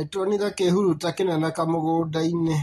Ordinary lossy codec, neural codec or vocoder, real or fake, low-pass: AAC, 32 kbps; none; real; 19.8 kHz